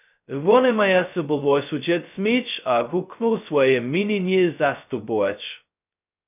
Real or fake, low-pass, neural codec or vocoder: fake; 3.6 kHz; codec, 16 kHz, 0.2 kbps, FocalCodec